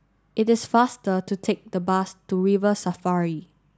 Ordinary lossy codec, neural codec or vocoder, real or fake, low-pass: none; none; real; none